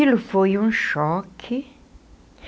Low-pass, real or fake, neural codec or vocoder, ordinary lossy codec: none; real; none; none